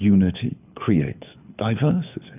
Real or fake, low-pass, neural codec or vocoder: fake; 3.6 kHz; codec, 24 kHz, 6 kbps, HILCodec